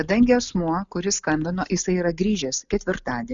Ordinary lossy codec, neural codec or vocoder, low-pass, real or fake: Opus, 64 kbps; none; 7.2 kHz; real